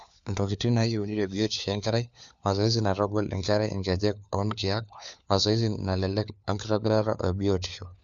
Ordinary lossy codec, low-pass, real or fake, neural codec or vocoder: none; 7.2 kHz; fake; codec, 16 kHz, 4 kbps, FunCodec, trained on LibriTTS, 50 frames a second